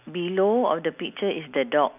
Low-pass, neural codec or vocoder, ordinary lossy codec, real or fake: 3.6 kHz; none; none; real